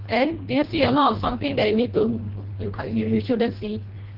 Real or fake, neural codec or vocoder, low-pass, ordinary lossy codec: fake; codec, 24 kHz, 1.5 kbps, HILCodec; 5.4 kHz; Opus, 16 kbps